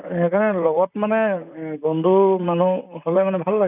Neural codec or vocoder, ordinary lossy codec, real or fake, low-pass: vocoder, 44.1 kHz, 128 mel bands, Pupu-Vocoder; none; fake; 3.6 kHz